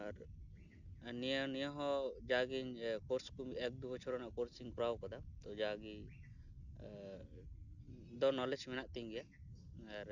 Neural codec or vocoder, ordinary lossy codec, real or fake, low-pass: none; none; real; 7.2 kHz